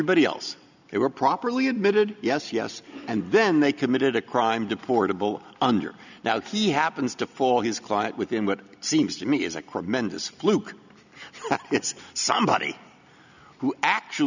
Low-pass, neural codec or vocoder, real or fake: 7.2 kHz; none; real